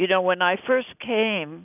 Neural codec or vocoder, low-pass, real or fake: none; 3.6 kHz; real